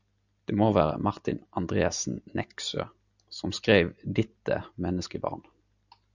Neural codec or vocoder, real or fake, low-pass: none; real; 7.2 kHz